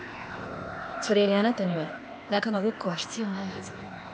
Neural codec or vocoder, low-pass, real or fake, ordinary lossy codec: codec, 16 kHz, 0.8 kbps, ZipCodec; none; fake; none